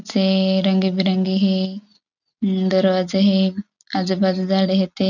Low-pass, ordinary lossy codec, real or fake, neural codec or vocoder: 7.2 kHz; none; real; none